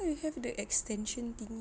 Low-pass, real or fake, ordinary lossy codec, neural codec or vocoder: none; real; none; none